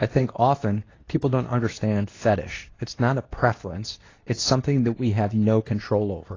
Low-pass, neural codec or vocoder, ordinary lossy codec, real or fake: 7.2 kHz; codec, 24 kHz, 0.9 kbps, WavTokenizer, medium speech release version 2; AAC, 32 kbps; fake